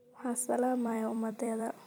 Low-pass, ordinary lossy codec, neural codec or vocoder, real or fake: none; none; vocoder, 44.1 kHz, 128 mel bands every 256 samples, BigVGAN v2; fake